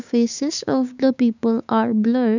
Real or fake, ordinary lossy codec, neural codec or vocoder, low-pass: fake; none; codec, 16 kHz, 6 kbps, DAC; 7.2 kHz